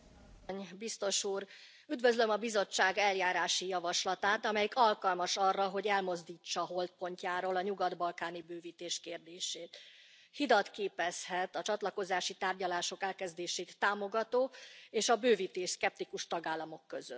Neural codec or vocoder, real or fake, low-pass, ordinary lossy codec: none; real; none; none